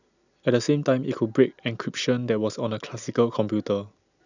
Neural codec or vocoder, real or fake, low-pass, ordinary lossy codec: none; real; 7.2 kHz; none